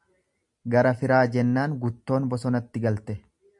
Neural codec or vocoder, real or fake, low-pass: none; real; 10.8 kHz